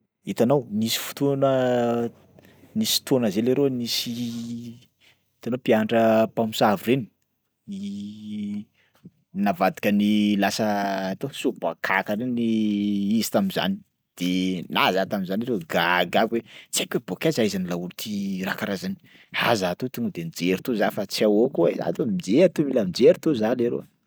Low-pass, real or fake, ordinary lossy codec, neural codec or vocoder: none; real; none; none